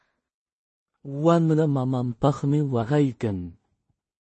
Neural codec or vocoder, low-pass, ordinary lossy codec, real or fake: codec, 16 kHz in and 24 kHz out, 0.4 kbps, LongCat-Audio-Codec, two codebook decoder; 10.8 kHz; MP3, 32 kbps; fake